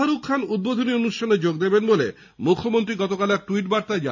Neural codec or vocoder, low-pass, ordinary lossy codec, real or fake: none; 7.2 kHz; none; real